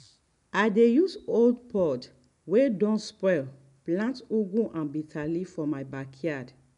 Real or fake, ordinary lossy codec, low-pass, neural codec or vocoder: real; none; 10.8 kHz; none